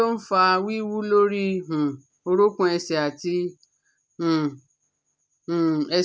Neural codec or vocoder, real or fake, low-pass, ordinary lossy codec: none; real; none; none